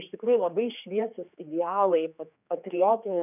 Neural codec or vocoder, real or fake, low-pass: codec, 16 kHz, 4 kbps, X-Codec, HuBERT features, trained on general audio; fake; 3.6 kHz